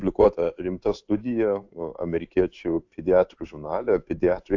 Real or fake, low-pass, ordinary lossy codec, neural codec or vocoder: fake; 7.2 kHz; AAC, 48 kbps; codec, 16 kHz in and 24 kHz out, 1 kbps, XY-Tokenizer